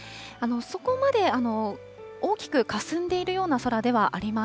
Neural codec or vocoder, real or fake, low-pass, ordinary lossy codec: none; real; none; none